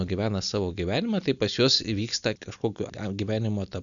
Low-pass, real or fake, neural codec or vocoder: 7.2 kHz; real; none